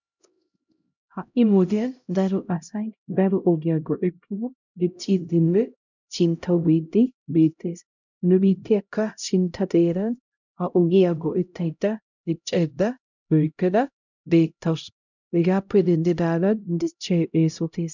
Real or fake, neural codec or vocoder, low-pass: fake; codec, 16 kHz, 0.5 kbps, X-Codec, HuBERT features, trained on LibriSpeech; 7.2 kHz